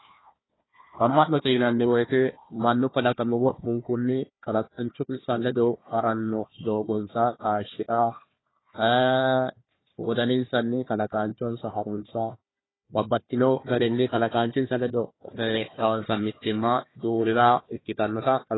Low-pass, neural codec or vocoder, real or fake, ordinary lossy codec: 7.2 kHz; codec, 16 kHz, 1 kbps, FunCodec, trained on Chinese and English, 50 frames a second; fake; AAC, 16 kbps